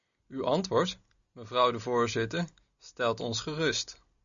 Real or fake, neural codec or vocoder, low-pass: real; none; 7.2 kHz